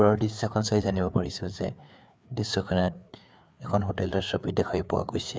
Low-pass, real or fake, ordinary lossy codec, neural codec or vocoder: none; fake; none; codec, 16 kHz, 4 kbps, FreqCodec, larger model